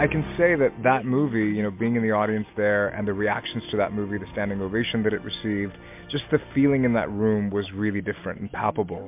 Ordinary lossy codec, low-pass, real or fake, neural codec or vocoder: MP3, 32 kbps; 3.6 kHz; real; none